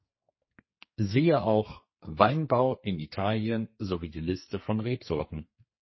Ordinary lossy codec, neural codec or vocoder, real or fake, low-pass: MP3, 24 kbps; codec, 44.1 kHz, 2.6 kbps, SNAC; fake; 7.2 kHz